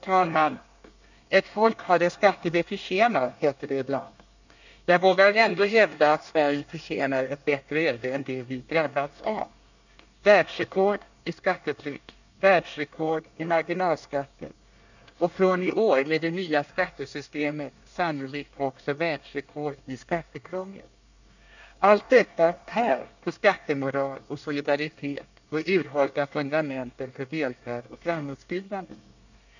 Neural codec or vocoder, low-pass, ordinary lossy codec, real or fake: codec, 24 kHz, 1 kbps, SNAC; 7.2 kHz; none; fake